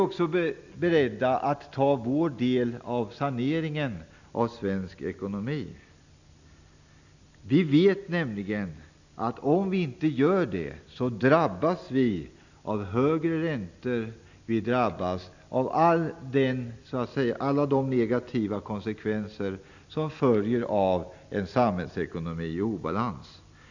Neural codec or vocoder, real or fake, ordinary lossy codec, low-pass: none; real; none; 7.2 kHz